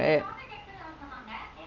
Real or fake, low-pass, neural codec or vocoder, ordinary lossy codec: real; 7.2 kHz; none; Opus, 24 kbps